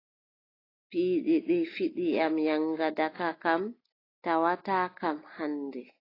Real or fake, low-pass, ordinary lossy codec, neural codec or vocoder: real; 5.4 kHz; AAC, 24 kbps; none